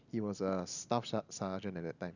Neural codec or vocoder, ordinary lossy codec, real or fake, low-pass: none; none; real; 7.2 kHz